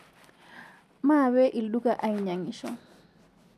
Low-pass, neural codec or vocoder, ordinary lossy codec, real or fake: 14.4 kHz; none; none; real